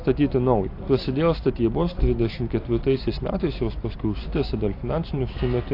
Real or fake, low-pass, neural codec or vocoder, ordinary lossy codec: real; 5.4 kHz; none; AAC, 32 kbps